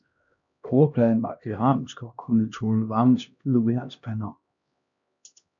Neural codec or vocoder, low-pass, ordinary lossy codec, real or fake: codec, 16 kHz, 1 kbps, X-Codec, HuBERT features, trained on LibriSpeech; 7.2 kHz; MP3, 96 kbps; fake